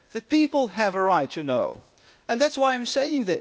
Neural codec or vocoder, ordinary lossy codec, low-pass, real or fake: codec, 16 kHz, 0.8 kbps, ZipCodec; none; none; fake